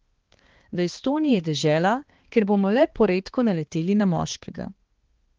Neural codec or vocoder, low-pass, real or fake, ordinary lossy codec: codec, 16 kHz, 2 kbps, X-Codec, HuBERT features, trained on balanced general audio; 7.2 kHz; fake; Opus, 16 kbps